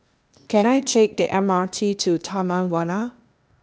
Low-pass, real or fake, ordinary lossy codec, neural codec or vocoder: none; fake; none; codec, 16 kHz, 0.8 kbps, ZipCodec